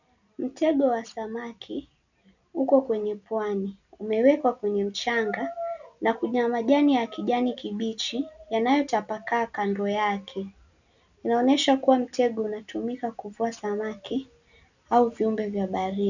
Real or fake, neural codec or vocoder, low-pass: real; none; 7.2 kHz